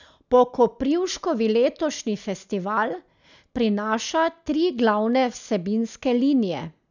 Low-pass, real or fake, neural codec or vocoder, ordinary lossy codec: 7.2 kHz; real; none; none